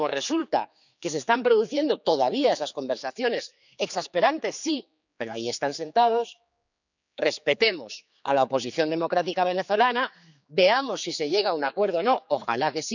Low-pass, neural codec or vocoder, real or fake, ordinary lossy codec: 7.2 kHz; codec, 16 kHz, 4 kbps, X-Codec, HuBERT features, trained on general audio; fake; none